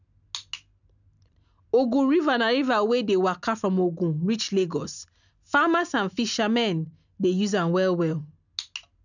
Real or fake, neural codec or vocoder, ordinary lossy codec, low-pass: real; none; none; 7.2 kHz